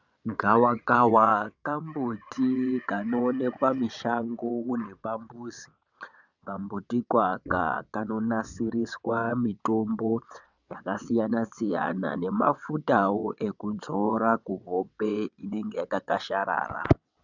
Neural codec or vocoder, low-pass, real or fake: vocoder, 22.05 kHz, 80 mel bands, WaveNeXt; 7.2 kHz; fake